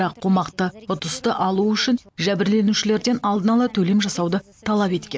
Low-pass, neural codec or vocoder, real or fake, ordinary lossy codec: none; none; real; none